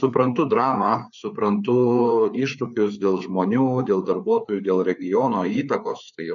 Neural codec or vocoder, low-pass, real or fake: codec, 16 kHz, 4 kbps, FreqCodec, larger model; 7.2 kHz; fake